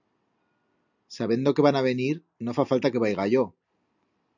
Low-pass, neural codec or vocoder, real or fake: 7.2 kHz; none; real